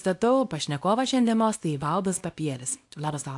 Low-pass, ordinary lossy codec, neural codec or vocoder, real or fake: 10.8 kHz; AAC, 64 kbps; codec, 24 kHz, 0.9 kbps, WavTokenizer, small release; fake